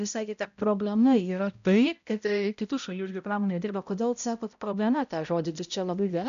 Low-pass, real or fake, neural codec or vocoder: 7.2 kHz; fake; codec, 16 kHz, 0.5 kbps, X-Codec, HuBERT features, trained on balanced general audio